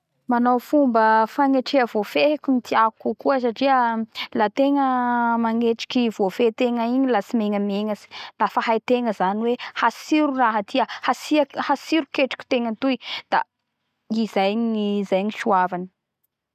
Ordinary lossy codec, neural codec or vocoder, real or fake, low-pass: none; none; real; 14.4 kHz